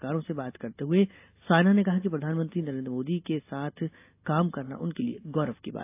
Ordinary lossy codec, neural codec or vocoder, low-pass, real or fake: AAC, 24 kbps; none; 3.6 kHz; real